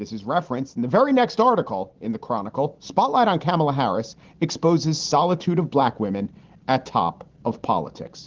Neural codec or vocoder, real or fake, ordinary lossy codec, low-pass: none; real; Opus, 16 kbps; 7.2 kHz